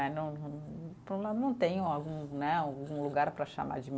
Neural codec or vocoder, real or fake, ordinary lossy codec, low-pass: none; real; none; none